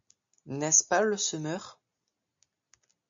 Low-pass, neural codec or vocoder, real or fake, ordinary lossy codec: 7.2 kHz; none; real; MP3, 48 kbps